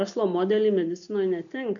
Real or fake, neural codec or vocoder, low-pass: real; none; 7.2 kHz